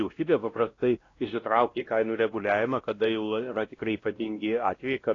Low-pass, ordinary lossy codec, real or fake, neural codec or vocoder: 7.2 kHz; AAC, 32 kbps; fake; codec, 16 kHz, 1 kbps, X-Codec, WavLM features, trained on Multilingual LibriSpeech